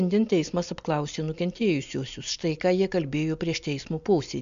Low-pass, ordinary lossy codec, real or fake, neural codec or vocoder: 7.2 kHz; MP3, 64 kbps; real; none